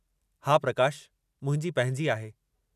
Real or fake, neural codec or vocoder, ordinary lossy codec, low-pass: real; none; none; 14.4 kHz